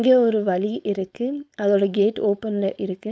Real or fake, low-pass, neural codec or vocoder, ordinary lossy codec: fake; none; codec, 16 kHz, 4.8 kbps, FACodec; none